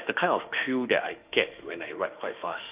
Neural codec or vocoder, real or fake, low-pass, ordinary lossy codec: autoencoder, 48 kHz, 32 numbers a frame, DAC-VAE, trained on Japanese speech; fake; 3.6 kHz; Opus, 24 kbps